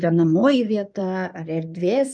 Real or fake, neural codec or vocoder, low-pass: fake; codec, 16 kHz in and 24 kHz out, 2.2 kbps, FireRedTTS-2 codec; 9.9 kHz